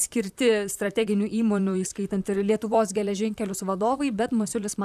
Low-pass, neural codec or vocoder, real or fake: 14.4 kHz; vocoder, 44.1 kHz, 128 mel bands, Pupu-Vocoder; fake